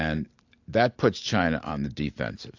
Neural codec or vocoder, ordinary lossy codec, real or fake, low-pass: none; AAC, 48 kbps; real; 7.2 kHz